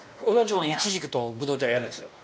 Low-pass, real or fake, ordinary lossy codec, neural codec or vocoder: none; fake; none; codec, 16 kHz, 1 kbps, X-Codec, WavLM features, trained on Multilingual LibriSpeech